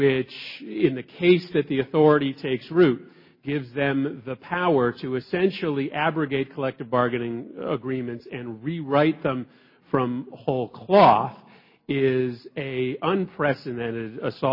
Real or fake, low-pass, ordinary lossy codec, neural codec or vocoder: real; 5.4 kHz; MP3, 24 kbps; none